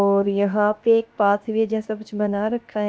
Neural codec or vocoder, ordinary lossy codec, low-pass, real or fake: codec, 16 kHz, 0.7 kbps, FocalCodec; none; none; fake